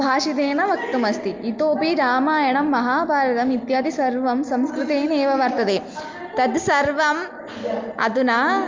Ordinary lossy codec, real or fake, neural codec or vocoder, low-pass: Opus, 24 kbps; real; none; 7.2 kHz